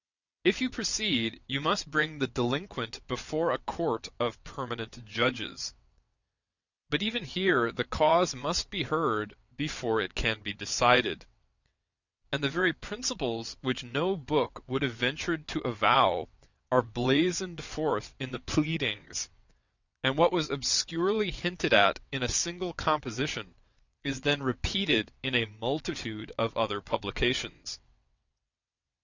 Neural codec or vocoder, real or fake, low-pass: vocoder, 22.05 kHz, 80 mel bands, WaveNeXt; fake; 7.2 kHz